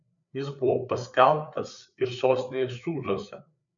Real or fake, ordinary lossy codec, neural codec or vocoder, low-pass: fake; MP3, 96 kbps; codec, 16 kHz, 4 kbps, FreqCodec, larger model; 7.2 kHz